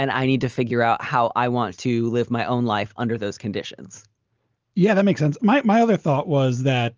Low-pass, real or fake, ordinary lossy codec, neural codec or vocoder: 7.2 kHz; real; Opus, 24 kbps; none